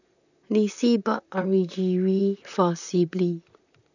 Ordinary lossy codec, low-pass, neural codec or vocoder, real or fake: none; 7.2 kHz; vocoder, 44.1 kHz, 128 mel bands, Pupu-Vocoder; fake